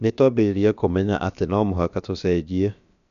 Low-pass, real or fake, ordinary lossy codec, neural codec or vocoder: 7.2 kHz; fake; none; codec, 16 kHz, about 1 kbps, DyCAST, with the encoder's durations